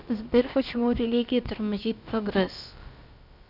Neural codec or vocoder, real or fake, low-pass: codec, 16 kHz, about 1 kbps, DyCAST, with the encoder's durations; fake; 5.4 kHz